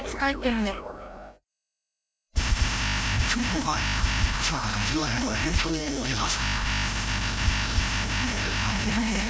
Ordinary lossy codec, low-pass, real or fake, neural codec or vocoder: none; none; fake; codec, 16 kHz, 0.5 kbps, FreqCodec, larger model